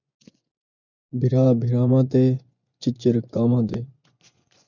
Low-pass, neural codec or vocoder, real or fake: 7.2 kHz; vocoder, 24 kHz, 100 mel bands, Vocos; fake